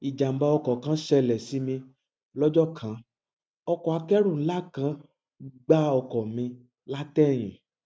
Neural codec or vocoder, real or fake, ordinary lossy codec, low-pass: none; real; none; none